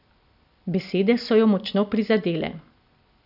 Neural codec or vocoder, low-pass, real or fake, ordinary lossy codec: none; 5.4 kHz; real; none